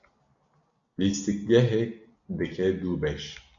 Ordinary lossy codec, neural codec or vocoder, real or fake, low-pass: AAC, 64 kbps; none; real; 7.2 kHz